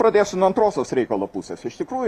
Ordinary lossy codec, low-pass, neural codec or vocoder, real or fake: Opus, 64 kbps; 14.4 kHz; none; real